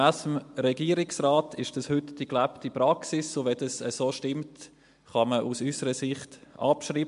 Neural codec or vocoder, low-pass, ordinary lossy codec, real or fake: none; 10.8 kHz; MP3, 64 kbps; real